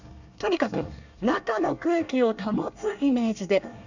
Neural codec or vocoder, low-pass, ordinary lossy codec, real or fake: codec, 24 kHz, 1 kbps, SNAC; 7.2 kHz; none; fake